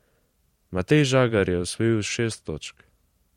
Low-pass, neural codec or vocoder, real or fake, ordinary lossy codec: 19.8 kHz; vocoder, 44.1 kHz, 128 mel bands every 512 samples, BigVGAN v2; fake; MP3, 64 kbps